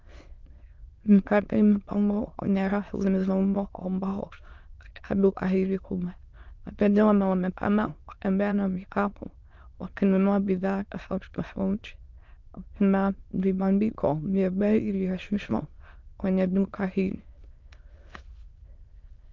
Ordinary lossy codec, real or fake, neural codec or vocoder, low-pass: Opus, 32 kbps; fake; autoencoder, 22.05 kHz, a latent of 192 numbers a frame, VITS, trained on many speakers; 7.2 kHz